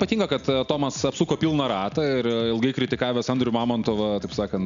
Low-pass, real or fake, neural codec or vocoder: 7.2 kHz; real; none